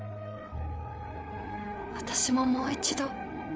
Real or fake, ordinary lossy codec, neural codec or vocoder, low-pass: fake; none; codec, 16 kHz, 8 kbps, FreqCodec, larger model; none